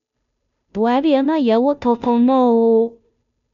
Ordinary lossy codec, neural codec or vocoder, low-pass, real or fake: none; codec, 16 kHz, 0.5 kbps, FunCodec, trained on Chinese and English, 25 frames a second; 7.2 kHz; fake